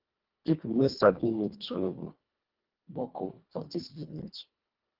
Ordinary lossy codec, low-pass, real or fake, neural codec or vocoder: Opus, 32 kbps; 5.4 kHz; fake; codec, 24 kHz, 1.5 kbps, HILCodec